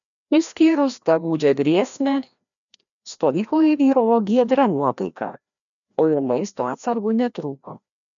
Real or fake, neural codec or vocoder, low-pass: fake; codec, 16 kHz, 1 kbps, FreqCodec, larger model; 7.2 kHz